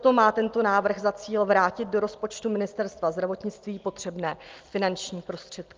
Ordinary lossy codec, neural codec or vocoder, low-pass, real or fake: Opus, 24 kbps; none; 7.2 kHz; real